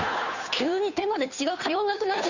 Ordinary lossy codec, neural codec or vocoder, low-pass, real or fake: none; codec, 16 kHz, 2 kbps, FunCodec, trained on Chinese and English, 25 frames a second; 7.2 kHz; fake